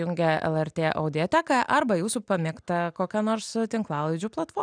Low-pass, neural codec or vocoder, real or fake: 9.9 kHz; none; real